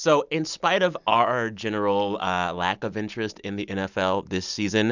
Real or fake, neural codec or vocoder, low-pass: real; none; 7.2 kHz